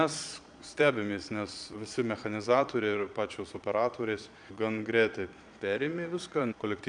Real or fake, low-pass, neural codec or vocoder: fake; 9.9 kHz; vocoder, 22.05 kHz, 80 mel bands, Vocos